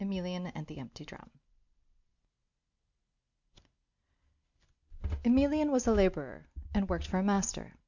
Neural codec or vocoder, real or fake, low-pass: none; real; 7.2 kHz